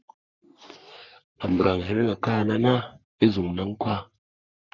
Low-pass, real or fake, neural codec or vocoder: 7.2 kHz; fake; codec, 44.1 kHz, 3.4 kbps, Pupu-Codec